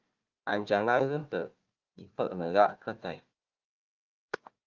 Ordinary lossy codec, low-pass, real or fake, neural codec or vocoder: Opus, 32 kbps; 7.2 kHz; fake; codec, 16 kHz, 1 kbps, FunCodec, trained on Chinese and English, 50 frames a second